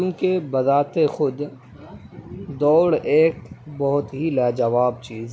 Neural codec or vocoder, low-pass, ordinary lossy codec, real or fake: none; none; none; real